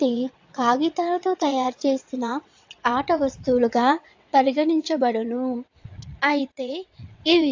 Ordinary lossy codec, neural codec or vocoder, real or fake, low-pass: AAC, 48 kbps; vocoder, 22.05 kHz, 80 mel bands, WaveNeXt; fake; 7.2 kHz